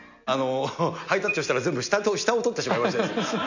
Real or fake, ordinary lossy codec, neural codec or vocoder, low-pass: real; none; none; 7.2 kHz